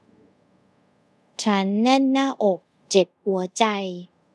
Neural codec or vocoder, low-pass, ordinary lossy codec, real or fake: codec, 24 kHz, 0.5 kbps, DualCodec; none; none; fake